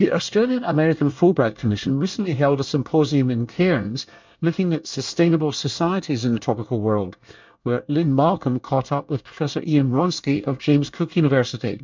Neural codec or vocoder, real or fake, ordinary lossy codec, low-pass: codec, 24 kHz, 1 kbps, SNAC; fake; MP3, 64 kbps; 7.2 kHz